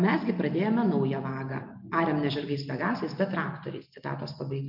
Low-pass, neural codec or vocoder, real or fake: 5.4 kHz; none; real